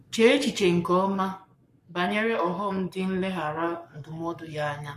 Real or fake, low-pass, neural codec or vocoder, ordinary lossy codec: fake; 14.4 kHz; codec, 44.1 kHz, 7.8 kbps, Pupu-Codec; MP3, 64 kbps